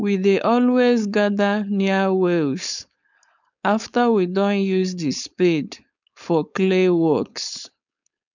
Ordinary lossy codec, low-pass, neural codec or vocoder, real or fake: none; 7.2 kHz; codec, 16 kHz, 4.8 kbps, FACodec; fake